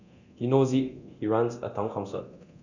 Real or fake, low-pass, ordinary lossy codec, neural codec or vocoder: fake; 7.2 kHz; none; codec, 24 kHz, 0.9 kbps, DualCodec